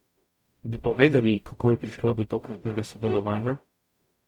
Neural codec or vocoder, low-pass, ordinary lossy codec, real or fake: codec, 44.1 kHz, 0.9 kbps, DAC; 19.8 kHz; Opus, 64 kbps; fake